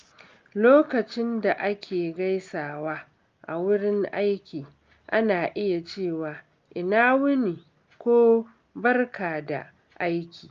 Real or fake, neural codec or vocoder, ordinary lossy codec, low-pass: real; none; Opus, 32 kbps; 7.2 kHz